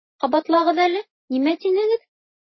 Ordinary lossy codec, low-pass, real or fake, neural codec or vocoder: MP3, 24 kbps; 7.2 kHz; real; none